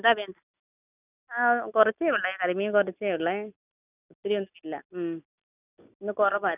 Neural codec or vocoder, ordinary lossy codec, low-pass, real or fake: none; none; 3.6 kHz; real